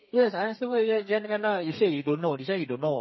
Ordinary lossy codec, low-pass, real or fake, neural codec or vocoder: MP3, 24 kbps; 7.2 kHz; fake; codec, 32 kHz, 1.9 kbps, SNAC